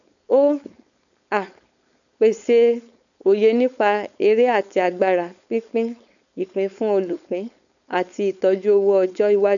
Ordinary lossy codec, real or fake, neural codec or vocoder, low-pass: none; fake; codec, 16 kHz, 4.8 kbps, FACodec; 7.2 kHz